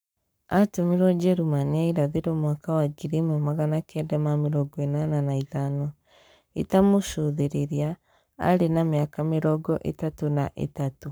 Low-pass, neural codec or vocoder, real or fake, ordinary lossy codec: none; codec, 44.1 kHz, 7.8 kbps, Pupu-Codec; fake; none